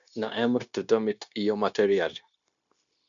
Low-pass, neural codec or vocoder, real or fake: 7.2 kHz; codec, 16 kHz, 0.9 kbps, LongCat-Audio-Codec; fake